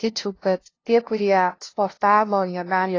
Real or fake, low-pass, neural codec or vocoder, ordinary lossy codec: fake; 7.2 kHz; codec, 16 kHz, 0.5 kbps, FunCodec, trained on LibriTTS, 25 frames a second; AAC, 32 kbps